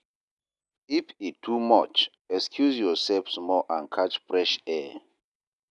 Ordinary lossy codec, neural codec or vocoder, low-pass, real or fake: none; none; 10.8 kHz; real